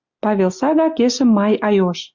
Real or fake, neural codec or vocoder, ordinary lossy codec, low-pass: real; none; Opus, 64 kbps; 7.2 kHz